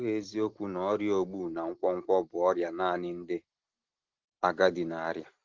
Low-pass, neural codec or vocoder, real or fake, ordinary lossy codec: 7.2 kHz; none; real; Opus, 16 kbps